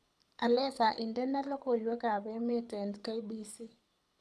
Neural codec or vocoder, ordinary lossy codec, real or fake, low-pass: codec, 24 kHz, 6 kbps, HILCodec; none; fake; none